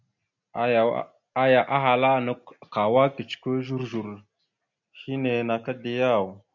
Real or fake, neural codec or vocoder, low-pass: real; none; 7.2 kHz